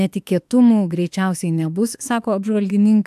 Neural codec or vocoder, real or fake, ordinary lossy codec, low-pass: autoencoder, 48 kHz, 32 numbers a frame, DAC-VAE, trained on Japanese speech; fake; AAC, 96 kbps; 14.4 kHz